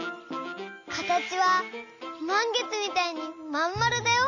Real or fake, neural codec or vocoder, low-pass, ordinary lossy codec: real; none; 7.2 kHz; none